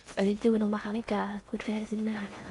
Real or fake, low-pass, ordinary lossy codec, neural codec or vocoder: fake; 10.8 kHz; Opus, 64 kbps; codec, 16 kHz in and 24 kHz out, 0.6 kbps, FocalCodec, streaming, 4096 codes